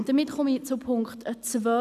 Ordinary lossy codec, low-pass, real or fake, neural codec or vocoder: none; 14.4 kHz; real; none